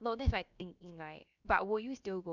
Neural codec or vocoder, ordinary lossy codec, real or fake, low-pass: codec, 16 kHz, about 1 kbps, DyCAST, with the encoder's durations; none; fake; 7.2 kHz